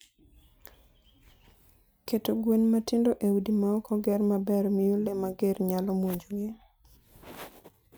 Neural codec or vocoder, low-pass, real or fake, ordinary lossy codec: none; none; real; none